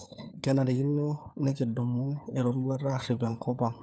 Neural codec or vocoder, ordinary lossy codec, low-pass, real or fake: codec, 16 kHz, 4 kbps, FunCodec, trained on LibriTTS, 50 frames a second; none; none; fake